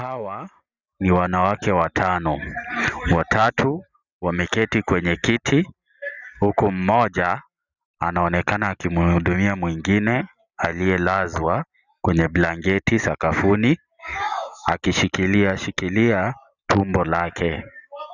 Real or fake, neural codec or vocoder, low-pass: real; none; 7.2 kHz